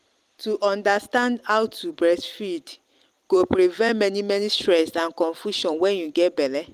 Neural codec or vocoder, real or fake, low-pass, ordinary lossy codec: vocoder, 44.1 kHz, 128 mel bands every 256 samples, BigVGAN v2; fake; 14.4 kHz; Opus, 32 kbps